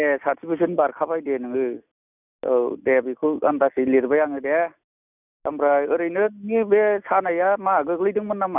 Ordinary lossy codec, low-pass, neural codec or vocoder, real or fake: none; 3.6 kHz; none; real